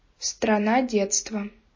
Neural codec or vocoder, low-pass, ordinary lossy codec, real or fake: none; 7.2 kHz; MP3, 32 kbps; real